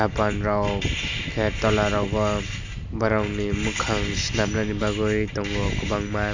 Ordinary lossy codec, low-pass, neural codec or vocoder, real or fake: AAC, 48 kbps; 7.2 kHz; none; real